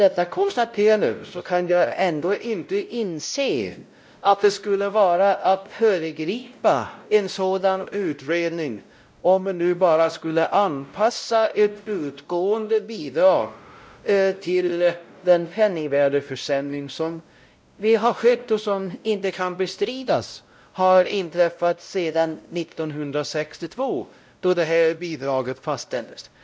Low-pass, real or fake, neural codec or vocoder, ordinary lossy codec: none; fake; codec, 16 kHz, 0.5 kbps, X-Codec, WavLM features, trained on Multilingual LibriSpeech; none